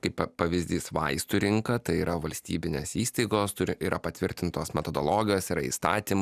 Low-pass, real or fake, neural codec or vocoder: 14.4 kHz; real; none